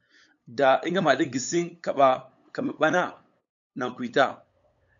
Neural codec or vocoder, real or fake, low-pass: codec, 16 kHz, 8 kbps, FunCodec, trained on LibriTTS, 25 frames a second; fake; 7.2 kHz